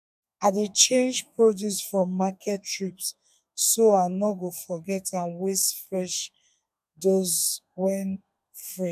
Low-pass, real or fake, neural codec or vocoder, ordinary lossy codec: 14.4 kHz; fake; codec, 32 kHz, 1.9 kbps, SNAC; none